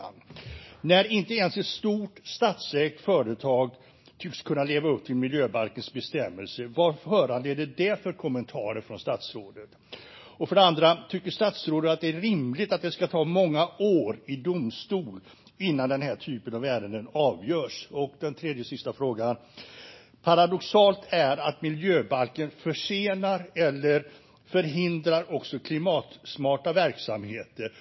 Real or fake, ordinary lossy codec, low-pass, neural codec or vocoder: real; MP3, 24 kbps; 7.2 kHz; none